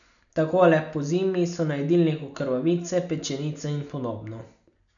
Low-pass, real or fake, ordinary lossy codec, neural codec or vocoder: 7.2 kHz; real; none; none